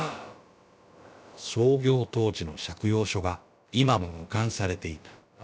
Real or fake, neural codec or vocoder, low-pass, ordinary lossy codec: fake; codec, 16 kHz, about 1 kbps, DyCAST, with the encoder's durations; none; none